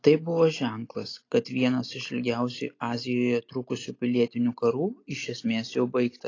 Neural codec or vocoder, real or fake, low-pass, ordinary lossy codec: none; real; 7.2 kHz; AAC, 32 kbps